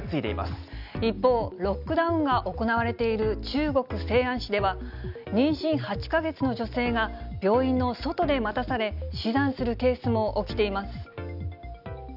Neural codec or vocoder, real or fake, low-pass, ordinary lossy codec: none; real; 5.4 kHz; none